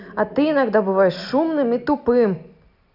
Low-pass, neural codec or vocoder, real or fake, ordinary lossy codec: 5.4 kHz; none; real; none